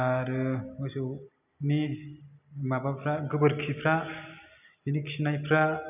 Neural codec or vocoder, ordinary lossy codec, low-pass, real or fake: none; none; 3.6 kHz; real